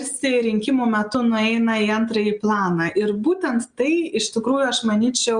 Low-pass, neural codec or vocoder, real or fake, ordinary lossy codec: 9.9 kHz; none; real; Opus, 64 kbps